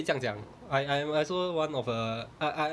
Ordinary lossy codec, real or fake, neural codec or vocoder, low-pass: none; real; none; none